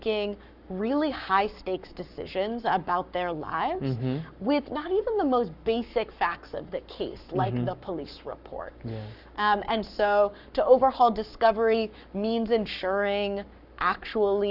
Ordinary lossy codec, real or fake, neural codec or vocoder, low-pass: Opus, 64 kbps; fake; codec, 44.1 kHz, 7.8 kbps, Pupu-Codec; 5.4 kHz